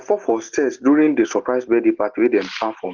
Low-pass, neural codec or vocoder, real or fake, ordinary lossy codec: 7.2 kHz; none; real; Opus, 16 kbps